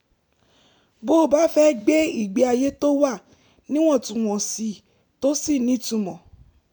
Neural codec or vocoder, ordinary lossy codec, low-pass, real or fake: none; none; none; real